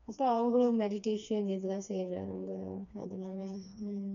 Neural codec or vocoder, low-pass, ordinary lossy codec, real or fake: codec, 16 kHz, 2 kbps, FreqCodec, smaller model; 7.2 kHz; none; fake